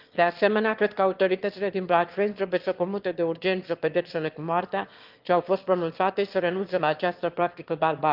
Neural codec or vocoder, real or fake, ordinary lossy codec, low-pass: autoencoder, 22.05 kHz, a latent of 192 numbers a frame, VITS, trained on one speaker; fake; Opus, 32 kbps; 5.4 kHz